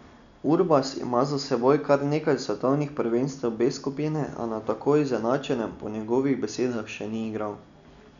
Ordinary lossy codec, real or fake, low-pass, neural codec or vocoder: none; real; 7.2 kHz; none